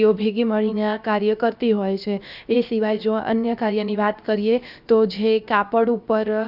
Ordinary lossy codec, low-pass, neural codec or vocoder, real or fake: Opus, 64 kbps; 5.4 kHz; codec, 16 kHz, 0.7 kbps, FocalCodec; fake